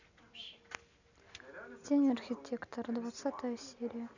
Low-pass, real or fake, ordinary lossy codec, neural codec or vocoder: 7.2 kHz; real; none; none